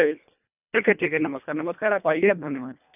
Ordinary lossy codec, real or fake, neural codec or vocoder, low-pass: none; fake; codec, 24 kHz, 1.5 kbps, HILCodec; 3.6 kHz